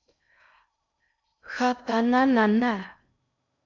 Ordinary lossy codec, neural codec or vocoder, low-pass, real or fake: MP3, 64 kbps; codec, 16 kHz in and 24 kHz out, 0.6 kbps, FocalCodec, streaming, 4096 codes; 7.2 kHz; fake